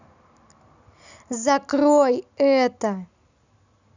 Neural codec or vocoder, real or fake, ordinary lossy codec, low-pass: none; real; none; 7.2 kHz